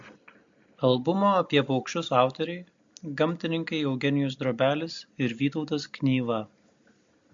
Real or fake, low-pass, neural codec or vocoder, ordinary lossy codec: real; 7.2 kHz; none; MP3, 48 kbps